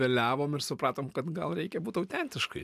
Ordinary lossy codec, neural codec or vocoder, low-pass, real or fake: AAC, 96 kbps; none; 14.4 kHz; real